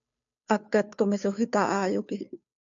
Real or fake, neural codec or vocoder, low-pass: fake; codec, 16 kHz, 2 kbps, FunCodec, trained on Chinese and English, 25 frames a second; 7.2 kHz